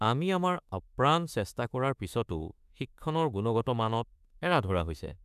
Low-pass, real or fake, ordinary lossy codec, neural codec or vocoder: 14.4 kHz; fake; none; autoencoder, 48 kHz, 32 numbers a frame, DAC-VAE, trained on Japanese speech